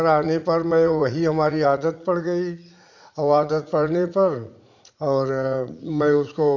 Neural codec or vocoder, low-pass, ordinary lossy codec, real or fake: vocoder, 44.1 kHz, 80 mel bands, Vocos; 7.2 kHz; none; fake